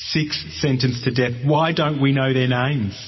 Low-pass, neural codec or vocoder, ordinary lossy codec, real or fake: 7.2 kHz; none; MP3, 24 kbps; real